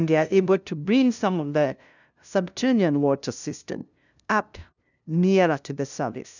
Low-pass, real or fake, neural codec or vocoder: 7.2 kHz; fake; codec, 16 kHz, 0.5 kbps, FunCodec, trained on LibriTTS, 25 frames a second